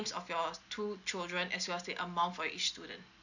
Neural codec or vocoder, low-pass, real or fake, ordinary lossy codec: none; 7.2 kHz; real; none